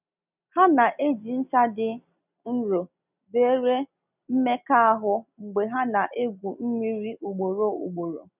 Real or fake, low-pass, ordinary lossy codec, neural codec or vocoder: real; 3.6 kHz; none; none